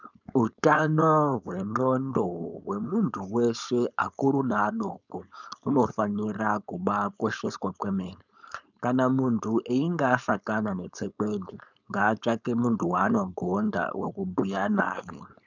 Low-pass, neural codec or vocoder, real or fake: 7.2 kHz; codec, 16 kHz, 4.8 kbps, FACodec; fake